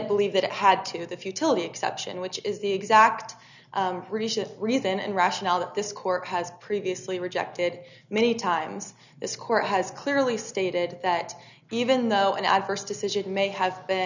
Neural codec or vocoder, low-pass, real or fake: none; 7.2 kHz; real